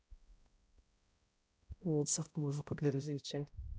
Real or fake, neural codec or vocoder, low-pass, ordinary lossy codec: fake; codec, 16 kHz, 0.5 kbps, X-Codec, HuBERT features, trained on balanced general audio; none; none